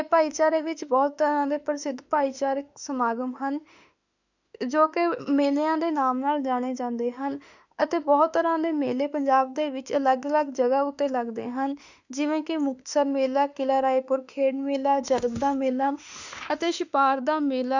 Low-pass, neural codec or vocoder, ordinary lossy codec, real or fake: 7.2 kHz; autoencoder, 48 kHz, 32 numbers a frame, DAC-VAE, trained on Japanese speech; none; fake